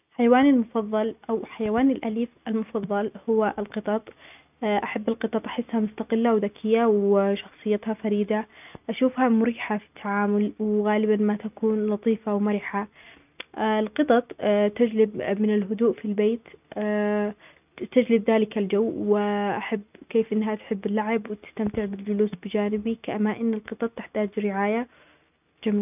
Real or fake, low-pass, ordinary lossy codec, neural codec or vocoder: real; 3.6 kHz; none; none